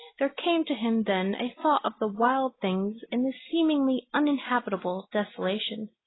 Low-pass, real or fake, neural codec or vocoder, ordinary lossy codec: 7.2 kHz; real; none; AAC, 16 kbps